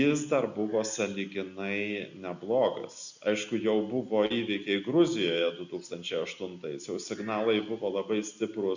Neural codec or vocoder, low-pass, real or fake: none; 7.2 kHz; real